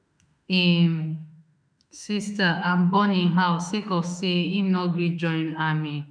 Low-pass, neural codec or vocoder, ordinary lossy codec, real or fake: 9.9 kHz; autoencoder, 48 kHz, 32 numbers a frame, DAC-VAE, trained on Japanese speech; none; fake